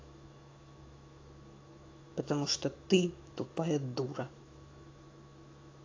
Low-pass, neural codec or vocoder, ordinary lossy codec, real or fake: 7.2 kHz; autoencoder, 48 kHz, 128 numbers a frame, DAC-VAE, trained on Japanese speech; AAC, 32 kbps; fake